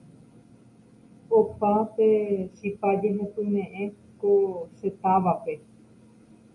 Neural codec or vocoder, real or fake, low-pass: none; real; 10.8 kHz